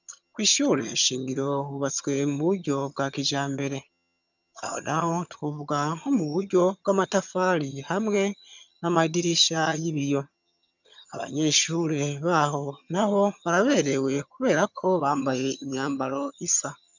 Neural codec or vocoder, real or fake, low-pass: vocoder, 22.05 kHz, 80 mel bands, HiFi-GAN; fake; 7.2 kHz